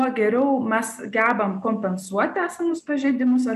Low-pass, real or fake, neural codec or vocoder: 14.4 kHz; real; none